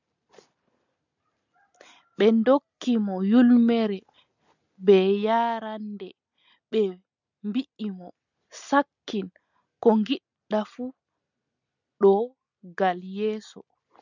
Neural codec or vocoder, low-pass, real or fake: none; 7.2 kHz; real